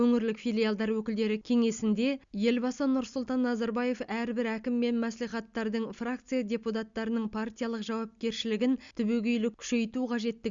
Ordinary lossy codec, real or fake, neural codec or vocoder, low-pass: Opus, 64 kbps; real; none; 7.2 kHz